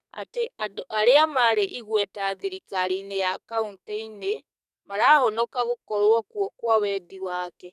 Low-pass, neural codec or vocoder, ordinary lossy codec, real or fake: 14.4 kHz; codec, 44.1 kHz, 2.6 kbps, SNAC; none; fake